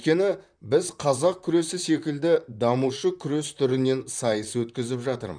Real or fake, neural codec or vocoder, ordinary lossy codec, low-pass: fake; vocoder, 44.1 kHz, 128 mel bands, Pupu-Vocoder; none; 9.9 kHz